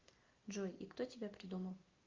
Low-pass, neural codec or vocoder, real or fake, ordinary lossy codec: 7.2 kHz; none; real; Opus, 32 kbps